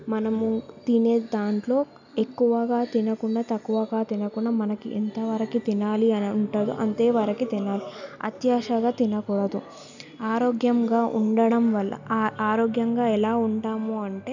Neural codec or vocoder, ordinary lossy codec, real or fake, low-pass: none; none; real; 7.2 kHz